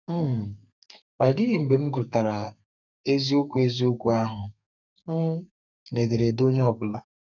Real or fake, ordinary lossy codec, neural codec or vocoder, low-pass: fake; none; codec, 32 kHz, 1.9 kbps, SNAC; 7.2 kHz